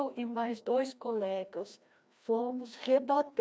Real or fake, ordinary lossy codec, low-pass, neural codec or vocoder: fake; none; none; codec, 16 kHz, 1 kbps, FreqCodec, larger model